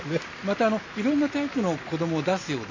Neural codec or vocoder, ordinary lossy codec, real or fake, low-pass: none; MP3, 32 kbps; real; 7.2 kHz